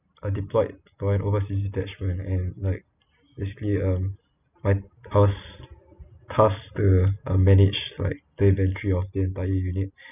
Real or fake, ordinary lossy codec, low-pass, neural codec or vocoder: real; none; 3.6 kHz; none